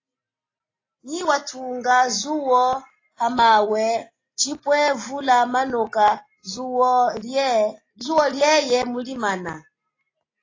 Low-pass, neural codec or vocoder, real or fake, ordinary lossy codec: 7.2 kHz; none; real; AAC, 32 kbps